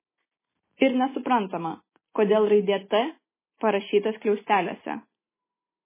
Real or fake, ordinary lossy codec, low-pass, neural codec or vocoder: real; MP3, 16 kbps; 3.6 kHz; none